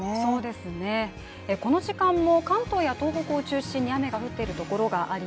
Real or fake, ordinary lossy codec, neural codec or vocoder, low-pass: real; none; none; none